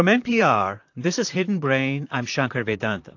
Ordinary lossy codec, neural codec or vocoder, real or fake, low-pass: AAC, 48 kbps; vocoder, 44.1 kHz, 128 mel bands, Pupu-Vocoder; fake; 7.2 kHz